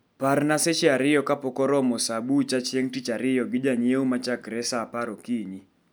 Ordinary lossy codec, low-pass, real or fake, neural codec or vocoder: none; none; real; none